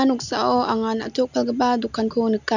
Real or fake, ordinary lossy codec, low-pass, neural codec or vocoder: real; none; 7.2 kHz; none